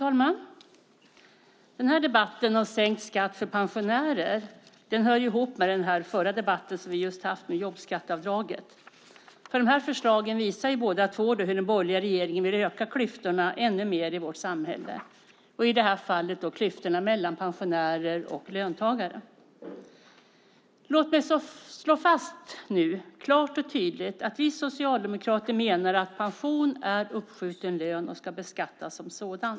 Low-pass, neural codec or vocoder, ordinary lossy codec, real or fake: none; none; none; real